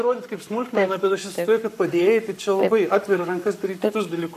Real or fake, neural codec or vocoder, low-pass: fake; codec, 44.1 kHz, 7.8 kbps, Pupu-Codec; 14.4 kHz